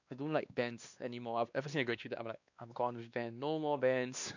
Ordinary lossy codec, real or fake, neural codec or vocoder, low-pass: none; fake; codec, 16 kHz, 2 kbps, X-Codec, WavLM features, trained on Multilingual LibriSpeech; 7.2 kHz